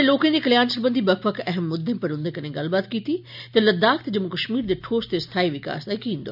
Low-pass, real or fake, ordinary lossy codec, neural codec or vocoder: 5.4 kHz; real; none; none